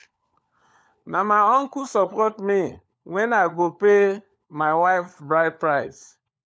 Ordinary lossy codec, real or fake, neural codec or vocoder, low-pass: none; fake; codec, 16 kHz, 4 kbps, FunCodec, trained on LibriTTS, 50 frames a second; none